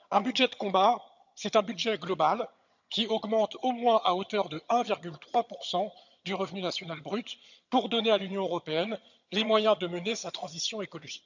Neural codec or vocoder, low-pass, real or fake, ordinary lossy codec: vocoder, 22.05 kHz, 80 mel bands, HiFi-GAN; 7.2 kHz; fake; none